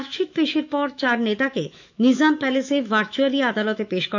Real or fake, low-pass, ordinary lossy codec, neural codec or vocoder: fake; 7.2 kHz; none; autoencoder, 48 kHz, 128 numbers a frame, DAC-VAE, trained on Japanese speech